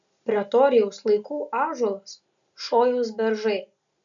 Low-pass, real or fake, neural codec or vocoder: 7.2 kHz; real; none